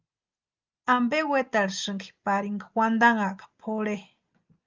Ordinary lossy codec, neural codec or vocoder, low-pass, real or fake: Opus, 32 kbps; none; 7.2 kHz; real